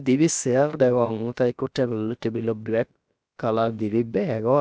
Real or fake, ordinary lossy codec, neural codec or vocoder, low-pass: fake; none; codec, 16 kHz, about 1 kbps, DyCAST, with the encoder's durations; none